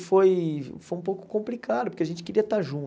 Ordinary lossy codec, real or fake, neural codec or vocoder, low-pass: none; real; none; none